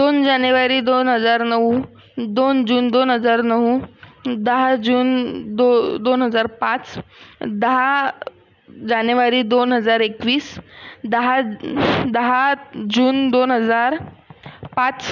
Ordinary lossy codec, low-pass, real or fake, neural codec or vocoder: none; 7.2 kHz; real; none